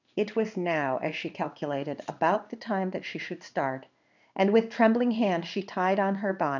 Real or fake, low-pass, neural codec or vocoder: fake; 7.2 kHz; codec, 16 kHz in and 24 kHz out, 1 kbps, XY-Tokenizer